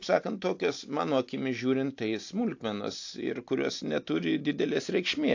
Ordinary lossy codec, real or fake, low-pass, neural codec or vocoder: AAC, 48 kbps; real; 7.2 kHz; none